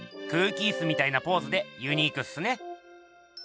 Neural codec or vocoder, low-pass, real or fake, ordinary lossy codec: none; none; real; none